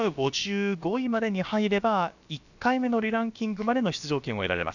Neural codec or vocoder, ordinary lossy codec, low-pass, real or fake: codec, 16 kHz, about 1 kbps, DyCAST, with the encoder's durations; none; 7.2 kHz; fake